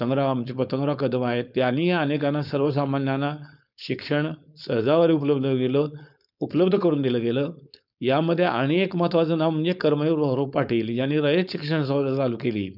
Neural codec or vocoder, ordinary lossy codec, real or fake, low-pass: codec, 16 kHz, 4.8 kbps, FACodec; none; fake; 5.4 kHz